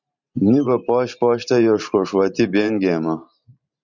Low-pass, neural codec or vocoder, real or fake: 7.2 kHz; vocoder, 44.1 kHz, 128 mel bands every 512 samples, BigVGAN v2; fake